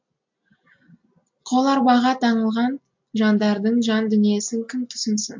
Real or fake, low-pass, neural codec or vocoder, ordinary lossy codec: real; 7.2 kHz; none; MP3, 48 kbps